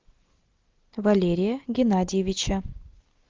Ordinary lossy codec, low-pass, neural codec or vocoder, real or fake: Opus, 32 kbps; 7.2 kHz; none; real